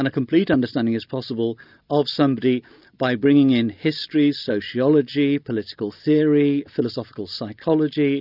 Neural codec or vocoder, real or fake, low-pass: none; real; 5.4 kHz